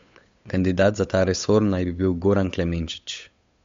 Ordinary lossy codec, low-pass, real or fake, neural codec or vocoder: MP3, 48 kbps; 7.2 kHz; fake; codec, 16 kHz, 8 kbps, FunCodec, trained on Chinese and English, 25 frames a second